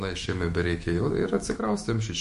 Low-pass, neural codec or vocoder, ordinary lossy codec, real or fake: 14.4 kHz; autoencoder, 48 kHz, 128 numbers a frame, DAC-VAE, trained on Japanese speech; MP3, 48 kbps; fake